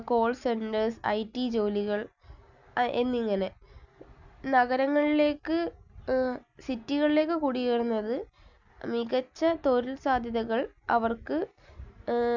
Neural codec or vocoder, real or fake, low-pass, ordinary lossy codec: none; real; 7.2 kHz; none